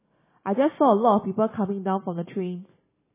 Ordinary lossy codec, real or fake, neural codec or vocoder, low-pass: MP3, 16 kbps; real; none; 3.6 kHz